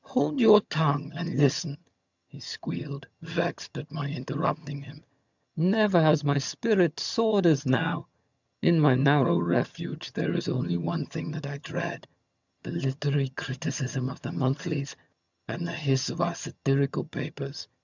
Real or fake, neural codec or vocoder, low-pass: fake; vocoder, 22.05 kHz, 80 mel bands, HiFi-GAN; 7.2 kHz